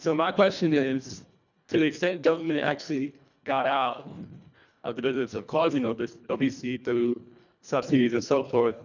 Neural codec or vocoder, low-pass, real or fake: codec, 24 kHz, 1.5 kbps, HILCodec; 7.2 kHz; fake